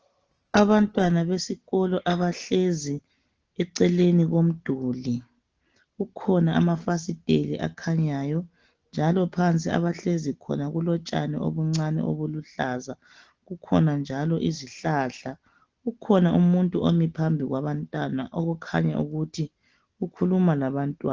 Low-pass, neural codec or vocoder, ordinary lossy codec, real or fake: 7.2 kHz; none; Opus, 16 kbps; real